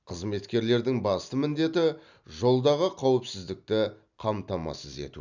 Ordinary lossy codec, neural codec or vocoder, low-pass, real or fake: none; none; 7.2 kHz; real